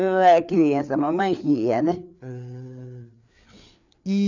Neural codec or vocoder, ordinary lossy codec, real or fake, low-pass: codec, 16 kHz, 4 kbps, FreqCodec, larger model; none; fake; 7.2 kHz